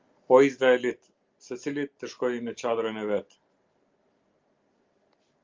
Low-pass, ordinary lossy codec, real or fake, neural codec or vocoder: 7.2 kHz; Opus, 32 kbps; real; none